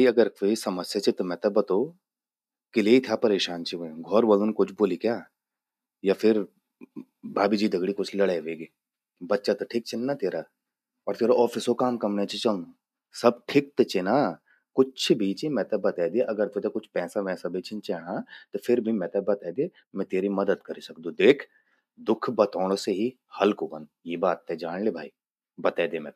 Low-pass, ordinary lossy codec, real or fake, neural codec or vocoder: 14.4 kHz; none; real; none